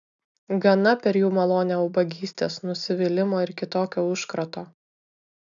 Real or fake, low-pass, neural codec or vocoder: real; 7.2 kHz; none